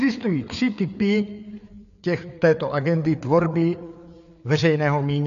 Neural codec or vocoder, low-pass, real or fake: codec, 16 kHz, 4 kbps, FreqCodec, larger model; 7.2 kHz; fake